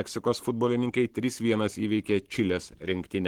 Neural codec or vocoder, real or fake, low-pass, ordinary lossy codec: codec, 44.1 kHz, 7.8 kbps, Pupu-Codec; fake; 19.8 kHz; Opus, 16 kbps